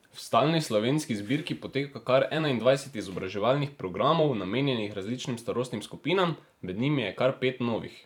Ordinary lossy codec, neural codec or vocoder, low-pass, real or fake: none; vocoder, 44.1 kHz, 128 mel bands every 512 samples, BigVGAN v2; 19.8 kHz; fake